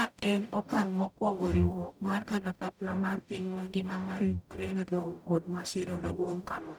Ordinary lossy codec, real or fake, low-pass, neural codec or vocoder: none; fake; none; codec, 44.1 kHz, 0.9 kbps, DAC